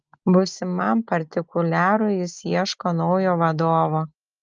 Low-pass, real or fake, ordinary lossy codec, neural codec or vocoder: 7.2 kHz; real; Opus, 24 kbps; none